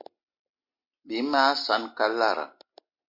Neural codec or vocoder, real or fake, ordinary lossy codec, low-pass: none; real; MP3, 32 kbps; 5.4 kHz